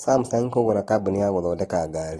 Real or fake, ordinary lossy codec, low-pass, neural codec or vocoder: real; AAC, 32 kbps; 19.8 kHz; none